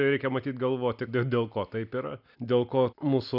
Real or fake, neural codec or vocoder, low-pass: real; none; 5.4 kHz